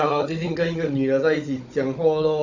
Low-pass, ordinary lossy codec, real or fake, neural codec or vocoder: 7.2 kHz; none; fake; codec, 16 kHz, 16 kbps, FunCodec, trained on Chinese and English, 50 frames a second